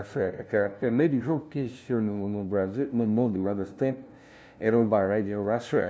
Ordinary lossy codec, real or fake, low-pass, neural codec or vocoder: none; fake; none; codec, 16 kHz, 0.5 kbps, FunCodec, trained on LibriTTS, 25 frames a second